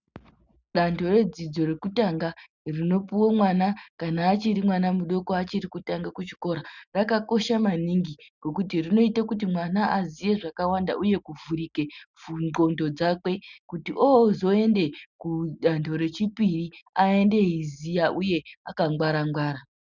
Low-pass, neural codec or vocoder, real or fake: 7.2 kHz; none; real